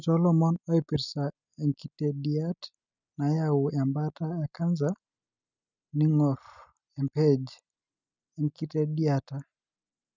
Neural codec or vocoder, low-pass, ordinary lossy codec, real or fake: none; 7.2 kHz; none; real